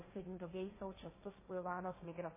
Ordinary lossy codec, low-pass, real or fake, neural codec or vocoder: MP3, 16 kbps; 3.6 kHz; fake; codec, 16 kHz, 1.1 kbps, Voila-Tokenizer